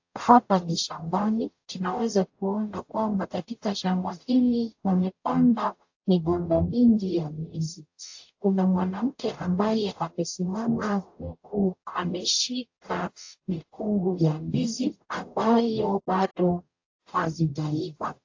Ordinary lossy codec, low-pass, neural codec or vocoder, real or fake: AAC, 48 kbps; 7.2 kHz; codec, 44.1 kHz, 0.9 kbps, DAC; fake